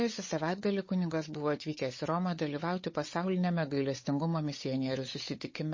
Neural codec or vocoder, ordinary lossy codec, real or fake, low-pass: codec, 16 kHz, 8 kbps, FunCodec, trained on Chinese and English, 25 frames a second; MP3, 32 kbps; fake; 7.2 kHz